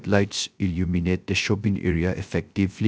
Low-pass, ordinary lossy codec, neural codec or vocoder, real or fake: none; none; codec, 16 kHz, 0.3 kbps, FocalCodec; fake